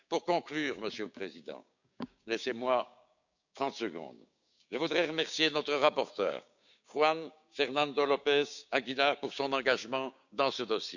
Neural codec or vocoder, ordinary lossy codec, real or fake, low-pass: codec, 16 kHz, 6 kbps, DAC; none; fake; 7.2 kHz